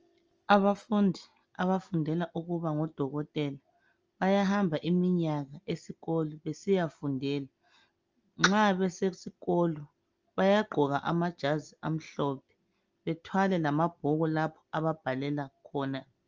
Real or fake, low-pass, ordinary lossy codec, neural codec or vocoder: real; 7.2 kHz; Opus, 24 kbps; none